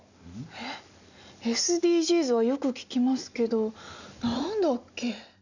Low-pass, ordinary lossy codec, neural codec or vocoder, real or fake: 7.2 kHz; none; none; real